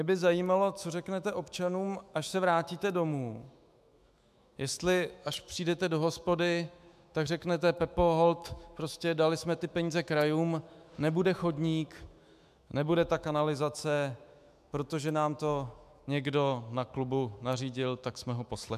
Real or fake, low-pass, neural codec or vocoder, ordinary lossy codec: fake; 14.4 kHz; autoencoder, 48 kHz, 128 numbers a frame, DAC-VAE, trained on Japanese speech; MP3, 96 kbps